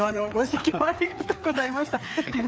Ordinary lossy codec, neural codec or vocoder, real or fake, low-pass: none; codec, 16 kHz, 4 kbps, FreqCodec, larger model; fake; none